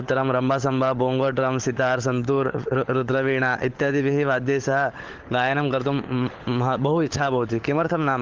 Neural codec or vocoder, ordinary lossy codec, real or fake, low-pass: codec, 16 kHz, 16 kbps, FunCodec, trained on LibriTTS, 50 frames a second; Opus, 16 kbps; fake; 7.2 kHz